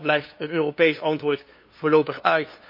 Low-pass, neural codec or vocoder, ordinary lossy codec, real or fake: 5.4 kHz; codec, 16 kHz, 2 kbps, FunCodec, trained on LibriTTS, 25 frames a second; MP3, 32 kbps; fake